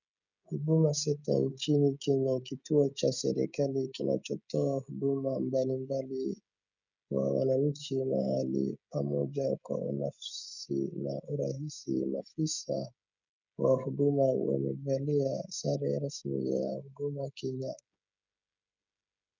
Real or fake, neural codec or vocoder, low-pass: fake; codec, 16 kHz, 16 kbps, FreqCodec, smaller model; 7.2 kHz